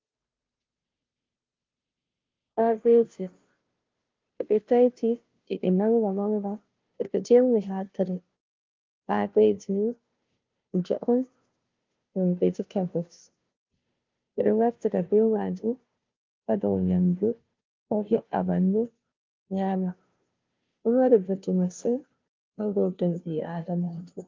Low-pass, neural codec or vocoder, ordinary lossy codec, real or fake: 7.2 kHz; codec, 16 kHz, 0.5 kbps, FunCodec, trained on Chinese and English, 25 frames a second; Opus, 24 kbps; fake